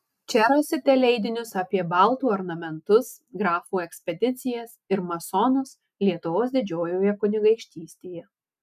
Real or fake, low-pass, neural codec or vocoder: real; 14.4 kHz; none